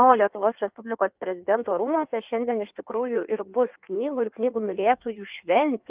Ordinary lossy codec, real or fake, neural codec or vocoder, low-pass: Opus, 32 kbps; fake; codec, 16 kHz in and 24 kHz out, 1.1 kbps, FireRedTTS-2 codec; 3.6 kHz